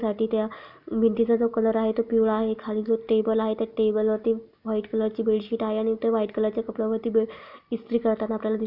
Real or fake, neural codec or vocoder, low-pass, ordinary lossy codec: real; none; 5.4 kHz; none